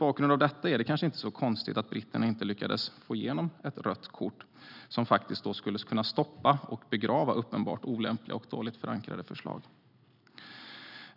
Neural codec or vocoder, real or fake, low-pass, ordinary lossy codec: none; real; 5.4 kHz; none